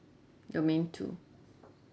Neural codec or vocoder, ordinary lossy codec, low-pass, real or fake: none; none; none; real